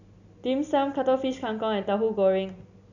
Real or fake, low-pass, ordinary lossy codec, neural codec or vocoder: real; 7.2 kHz; none; none